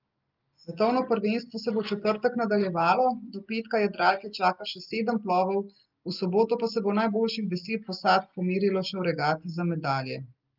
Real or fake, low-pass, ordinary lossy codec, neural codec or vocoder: real; 5.4 kHz; Opus, 24 kbps; none